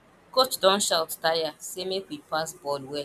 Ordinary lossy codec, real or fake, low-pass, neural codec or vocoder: none; real; 14.4 kHz; none